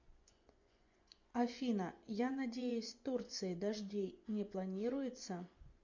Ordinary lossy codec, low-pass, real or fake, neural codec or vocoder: MP3, 48 kbps; 7.2 kHz; fake; vocoder, 24 kHz, 100 mel bands, Vocos